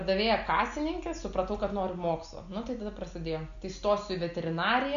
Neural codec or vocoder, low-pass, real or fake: none; 7.2 kHz; real